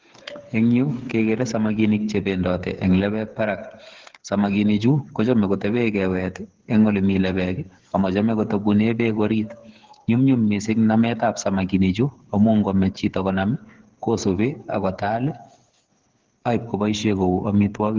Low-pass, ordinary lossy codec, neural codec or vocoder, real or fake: 7.2 kHz; Opus, 16 kbps; codec, 16 kHz, 8 kbps, FreqCodec, smaller model; fake